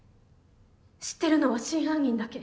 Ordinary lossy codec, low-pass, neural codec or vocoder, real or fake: none; none; none; real